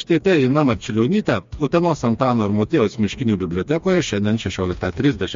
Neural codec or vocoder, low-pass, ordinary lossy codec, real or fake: codec, 16 kHz, 2 kbps, FreqCodec, smaller model; 7.2 kHz; MP3, 48 kbps; fake